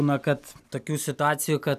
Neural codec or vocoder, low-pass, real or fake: none; 14.4 kHz; real